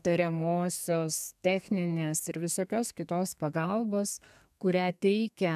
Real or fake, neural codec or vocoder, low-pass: fake; codec, 32 kHz, 1.9 kbps, SNAC; 14.4 kHz